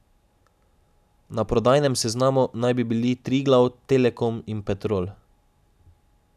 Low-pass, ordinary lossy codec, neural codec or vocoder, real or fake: 14.4 kHz; none; none; real